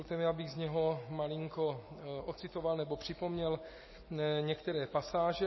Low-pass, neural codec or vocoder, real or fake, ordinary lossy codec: 7.2 kHz; none; real; MP3, 24 kbps